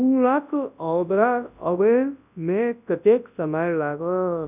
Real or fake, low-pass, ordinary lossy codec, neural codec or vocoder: fake; 3.6 kHz; none; codec, 24 kHz, 0.9 kbps, WavTokenizer, large speech release